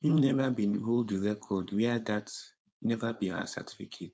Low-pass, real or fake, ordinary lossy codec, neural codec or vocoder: none; fake; none; codec, 16 kHz, 4.8 kbps, FACodec